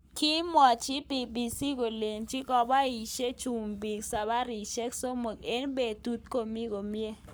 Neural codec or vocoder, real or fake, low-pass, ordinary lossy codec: codec, 44.1 kHz, 7.8 kbps, Pupu-Codec; fake; none; none